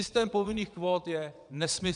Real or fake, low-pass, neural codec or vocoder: fake; 9.9 kHz; vocoder, 22.05 kHz, 80 mel bands, Vocos